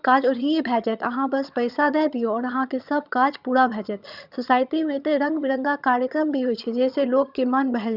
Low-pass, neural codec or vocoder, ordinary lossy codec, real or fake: 5.4 kHz; vocoder, 22.05 kHz, 80 mel bands, HiFi-GAN; Opus, 64 kbps; fake